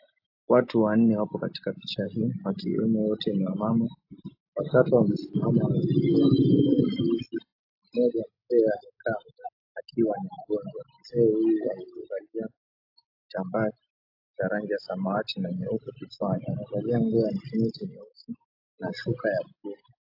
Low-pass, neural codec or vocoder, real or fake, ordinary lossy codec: 5.4 kHz; none; real; AAC, 32 kbps